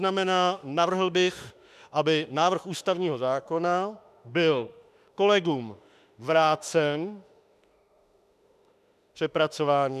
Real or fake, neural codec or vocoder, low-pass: fake; autoencoder, 48 kHz, 32 numbers a frame, DAC-VAE, trained on Japanese speech; 14.4 kHz